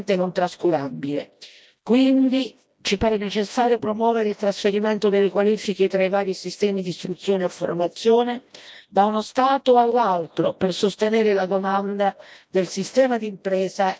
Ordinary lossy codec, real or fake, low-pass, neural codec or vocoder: none; fake; none; codec, 16 kHz, 1 kbps, FreqCodec, smaller model